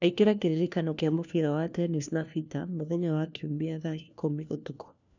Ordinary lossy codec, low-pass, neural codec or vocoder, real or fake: none; 7.2 kHz; codec, 16 kHz, 1 kbps, FunCodec, trained on LibriTTS, 50 frames a second; fake